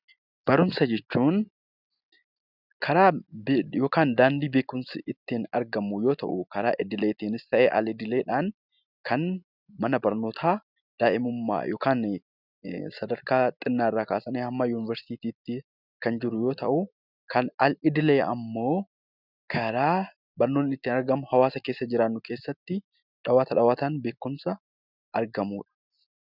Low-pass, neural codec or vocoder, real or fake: 5.4 kHz; none; real